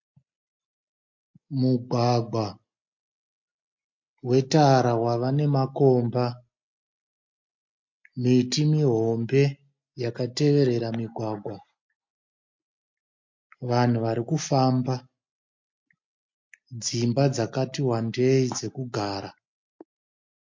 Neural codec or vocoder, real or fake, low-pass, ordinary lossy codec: none; real; 7.2 kHz; MP3, 48 kbps